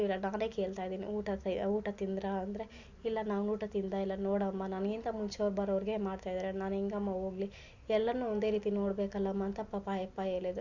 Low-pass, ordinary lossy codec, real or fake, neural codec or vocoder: 7.2 kHz; Opus, 64 kbps; real; none